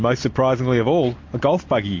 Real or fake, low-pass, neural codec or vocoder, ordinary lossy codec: real; 7.2 kHz; none; MP3, 48 kbps